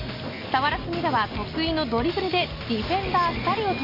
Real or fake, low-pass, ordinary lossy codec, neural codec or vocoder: real; 5.4 kHz; none; none